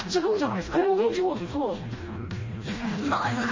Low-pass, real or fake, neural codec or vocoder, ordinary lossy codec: 7.2 kHz; fake; codec, 16 kHz, 1 kbps, FreqCodec, smaller model; MP3, 32 kbps